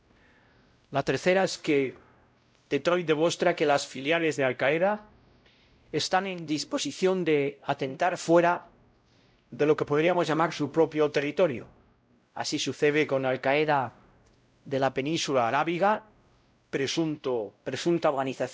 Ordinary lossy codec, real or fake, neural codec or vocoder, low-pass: none; fake; codec, 16 kHz, 0.5 kbps, X-Codec, WavLM features, trained on Multilingual LibriSpeech; none